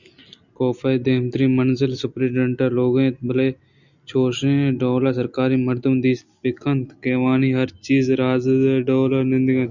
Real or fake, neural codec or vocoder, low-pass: real; none; 7.2 kHz